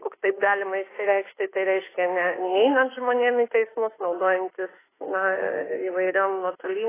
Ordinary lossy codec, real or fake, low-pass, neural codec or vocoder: AAC, 16 kbps; fake; 3.6 kHz; autoencoder, 48 kHz, 32 numbers a frame, DAC-VAE, trained on Japanese speech